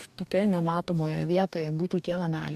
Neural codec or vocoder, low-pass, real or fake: codec, 44.1 kHz, 2.6 kbps, DAC; 14.4 kHz; fake